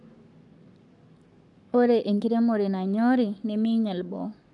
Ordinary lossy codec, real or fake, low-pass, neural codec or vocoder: none; fake; 10.8 kHz; codec, 44.1 kHz, 7.8 kbps, Pupu-Codec